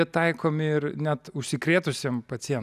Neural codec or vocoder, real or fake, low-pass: none; real; 14.4 kHz